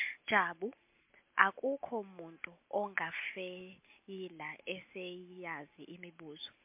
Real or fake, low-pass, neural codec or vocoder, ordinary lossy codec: real; 3.6 kHz; none; MP3, 32 kbps